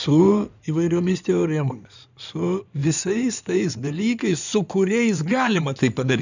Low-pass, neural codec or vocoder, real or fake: 7.2 kHz; codec, 16 kHz in and 24 kHz out, 2.2 kbps, FireRedTTS-2 codec; fake